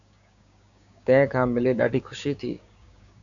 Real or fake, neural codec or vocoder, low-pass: fake; codec, 16 kHz, 6 kbps, DAC; 7.2 kHz